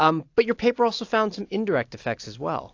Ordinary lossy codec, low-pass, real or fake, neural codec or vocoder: AAC, 48 kbps; 7.2 kHz; real; none